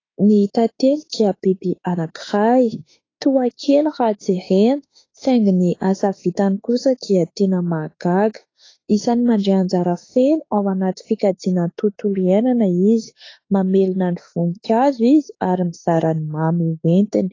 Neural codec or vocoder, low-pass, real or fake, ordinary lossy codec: autoencoder, 48 kHz, 32 numbers a frame, DAC-VAE, trained on Japanese speech; 7.2 kHz; fake; AAC, 32 kbps